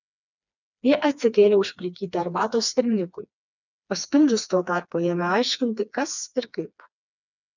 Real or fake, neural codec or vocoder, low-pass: fake; codec, 16 kHz, 2 kbps, FreqCodec, smaller model; 7.2 kHz